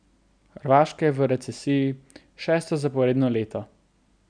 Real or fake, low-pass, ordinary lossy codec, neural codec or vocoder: real; 9.9 kHz; none; none